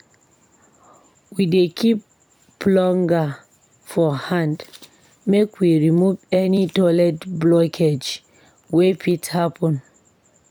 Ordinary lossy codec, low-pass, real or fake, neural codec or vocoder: none; 19.8 kHz; fake; vocoder, 44.1 kHz, 128 mel bands every 256 samples, BigVGAN v2